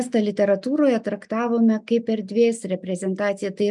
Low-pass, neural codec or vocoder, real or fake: 10.8 kHz; none; real